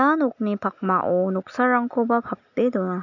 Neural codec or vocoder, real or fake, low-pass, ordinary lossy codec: none; real; 7.2 kHz; none